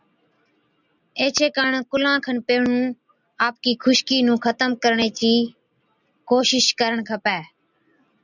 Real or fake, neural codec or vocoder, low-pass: real; none; 7.2 kHz